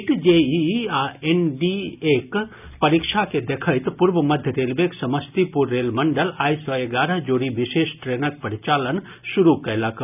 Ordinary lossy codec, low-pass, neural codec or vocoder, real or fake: none; 3.6 kHz; none; real